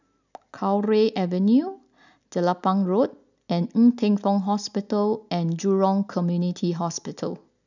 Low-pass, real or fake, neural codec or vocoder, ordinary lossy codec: 7.2 kHz; real; none; none